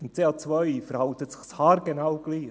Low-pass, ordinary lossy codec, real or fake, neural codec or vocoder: none; none; real; none